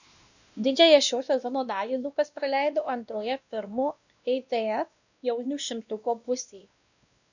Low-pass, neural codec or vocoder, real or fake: 7.2 kHz; codec, 16 kHz, 1 kbps, X-Codec, WavLM features, trained on Multilingual LibriSpeech; fake